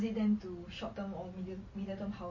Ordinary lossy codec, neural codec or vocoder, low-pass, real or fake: MP3, 32 kbps; none; 7.2 kHz; real